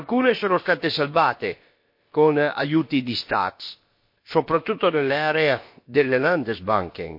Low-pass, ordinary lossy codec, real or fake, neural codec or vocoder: 5.4 kHz; MP3, 32 kbps; fake; codec, 16 kHz, about 1 kbps, DyCAST, with the encoder's durations